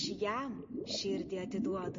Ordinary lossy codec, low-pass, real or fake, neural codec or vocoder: MP3, 32 kbps; 7.2 kHz; real; none